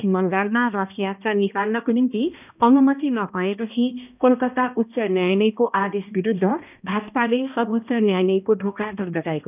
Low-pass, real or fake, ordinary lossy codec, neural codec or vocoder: 3.6 kHz; fake; none; codec, 16 kHz, 1 kbps, X-Codec, HuBERT features, trained on balanced general audio